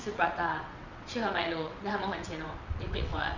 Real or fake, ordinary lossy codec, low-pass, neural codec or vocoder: fake; Opus, 64 kbps; 7.2 kHz; vocoder, 22.05 kHz, 80 mel bands, WaveNeXt